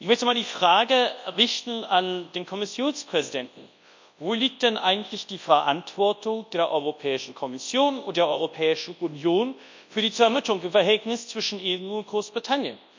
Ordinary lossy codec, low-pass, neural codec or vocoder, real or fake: MP3, 64 kbps; 7.2 kHz; codec, 24 kHz, 0.9 kbps, WavTokenizer, large speech release; fake